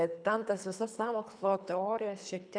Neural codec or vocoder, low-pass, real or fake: codec, 24 kHz, 3 kbps, HILCodec; 9.9 kHz; fake